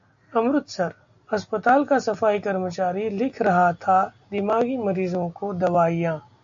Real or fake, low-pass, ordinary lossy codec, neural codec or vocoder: real; 7.2 kHz; AAC, 64 kbps; none